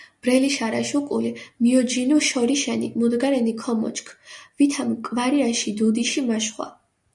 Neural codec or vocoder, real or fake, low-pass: none; real; 10.8 kHz